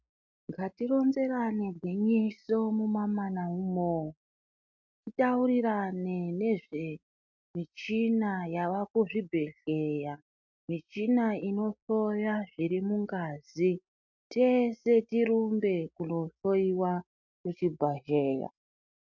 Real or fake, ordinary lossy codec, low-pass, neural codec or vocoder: real; MP3, 64 kbps; 7.2 kHz; none